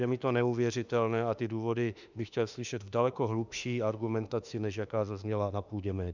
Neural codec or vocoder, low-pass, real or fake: autoencoder, 48 kHz, 32 numbers a frame, DAC-VAE, trained on Japanese speech; 7.2 kHz; fake